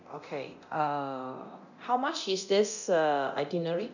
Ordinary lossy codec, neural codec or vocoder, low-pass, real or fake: none; codec, 24 kHz, 0.9 kbps, DualCodec; 7.2 kHz; fake